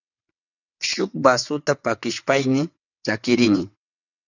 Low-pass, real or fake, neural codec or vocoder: 7.2 kHz; fake; vocoder, 22.05 kHz, 80 mel bands, WaveNeXt